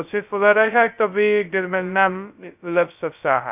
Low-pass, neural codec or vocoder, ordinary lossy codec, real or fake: 3.6 kHz; codec, 16 kHz, 0.2 kbps, FocalCodec; none; fake